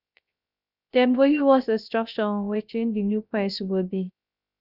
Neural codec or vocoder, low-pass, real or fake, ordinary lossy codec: codec, 16 kHz, 0.3 kbps, FocalCodec; 5.4 kHz; fake; none